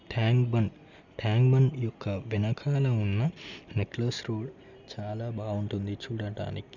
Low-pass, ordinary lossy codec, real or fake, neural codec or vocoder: 7.2 kHz; none; real; none